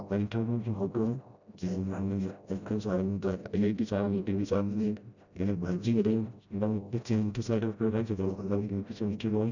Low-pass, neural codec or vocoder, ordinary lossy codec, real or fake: 7.2 kHz; codec, 16 kHz, 0.5 kbps, FreqCodec, smaller model; none; fake